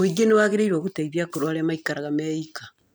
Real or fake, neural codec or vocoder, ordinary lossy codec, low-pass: real; none; none; none